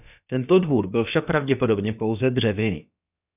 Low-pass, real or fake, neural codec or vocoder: 3.6 kHz; fake; codec, 16 kHz, about 1 kbps, DyCAST, with the encoder's durations